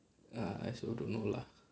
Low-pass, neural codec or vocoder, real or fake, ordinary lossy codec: none; none; real; none